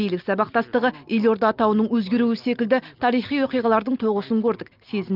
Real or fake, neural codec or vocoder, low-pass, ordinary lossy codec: real; none; 5.4 kHz; Opus, 32 kbps